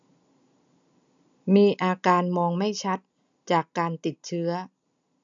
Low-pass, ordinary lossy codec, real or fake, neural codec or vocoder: 7.2 kHz; none; real; none